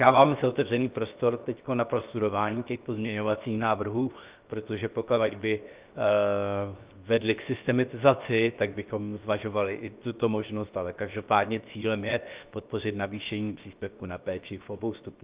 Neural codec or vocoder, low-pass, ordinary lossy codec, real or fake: codec, 16 kHz, 0.7 kbps, FocalCodec; 3.6 kHz; Opus, 24 kbps; fake